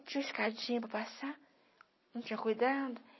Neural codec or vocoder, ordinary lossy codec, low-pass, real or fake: none; MP3, 24 kbps; 7.2 kHz; real